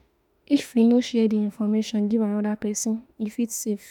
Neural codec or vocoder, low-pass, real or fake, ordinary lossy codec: autoencoder, 48 kHz, 32 numbers a frame, DAC-VAE, trained on Japanese speech; 19.8 kHz; fake; none